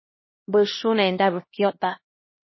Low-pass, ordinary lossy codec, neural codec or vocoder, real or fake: 7.2 kHz; MP3, 24 kbps; codec, 16 kHz, 1 kbps, X-Codec, HuBERT features, trained on LibriSpeech; fake